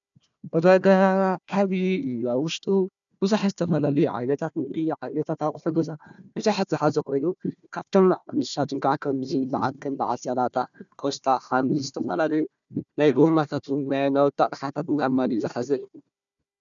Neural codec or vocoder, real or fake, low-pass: codec, 16 kHz, 1 kbps, FunCodec, trained on Chinese and English, 50 frames a second; fake; 7.2 kHz